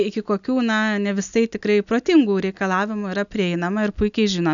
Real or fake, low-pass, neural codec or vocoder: real; 7.2 kHz; none